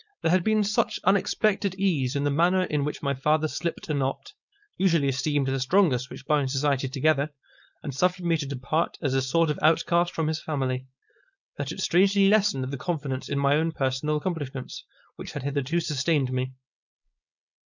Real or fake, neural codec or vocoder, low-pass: fake; codec, 16 kHz, 4.8 kbps, FACodec; 7.2 kHz